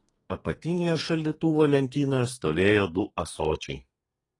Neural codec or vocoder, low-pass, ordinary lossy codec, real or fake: codec, 32 kHz, 1.9 kbps, SNAC; 10.8 kHz; AAC, 32 kbps; fake